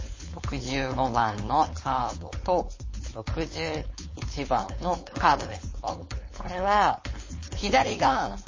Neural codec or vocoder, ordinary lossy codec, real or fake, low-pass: codec, 16 kHz, 4.8 kbps, FACodec; MP3, 32 kbps; fake; 7.2 kHz